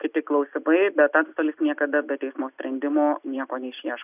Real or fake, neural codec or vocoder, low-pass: real; none; 3.6 kHz